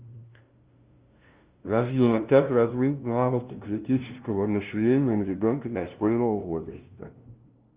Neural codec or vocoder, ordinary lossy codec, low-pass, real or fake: codec, 16 kHz, 0.5 kbps, FunCodec, trained on LibriTTS, 25 frames a second; Opus, 32 kbps; 3.6 kHz; fake